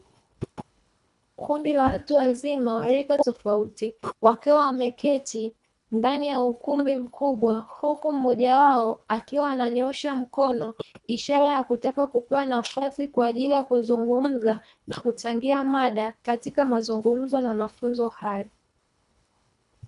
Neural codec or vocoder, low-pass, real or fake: codec, 24 kHz, 1.5 kbps, HILCodec; 10.8 kHz; fake